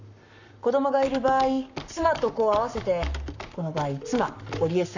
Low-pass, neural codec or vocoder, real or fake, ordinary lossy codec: 7.2 kHz; codec, 44.1 kHz, 7.8 kbps, Pupu-Codec; fake; none